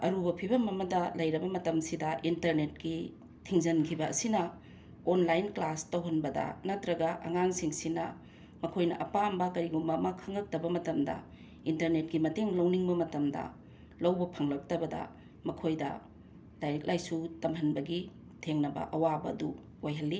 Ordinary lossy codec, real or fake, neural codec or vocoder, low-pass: none; real; none; none